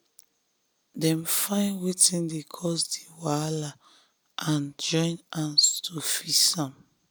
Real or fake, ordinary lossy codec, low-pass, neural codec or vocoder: real; none; none; none